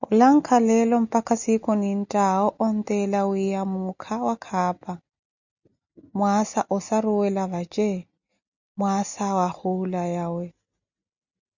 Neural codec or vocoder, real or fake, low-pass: none; real; 7.2 kHz